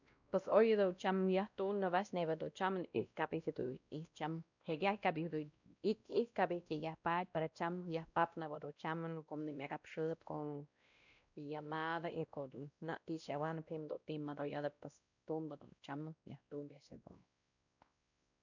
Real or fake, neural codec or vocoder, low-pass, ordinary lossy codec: fake; codec, 16 kHz, 1 kbps, X-Codec, WavLM features, trained on Multilingual LibriSpeech; 7.2 kHz; none